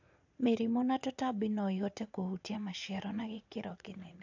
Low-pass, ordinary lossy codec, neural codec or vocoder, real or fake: 7.2 kHz; AAC, 48 kbps; vocoder, 44.1 kHz, 80 mel bands, Vocos; fake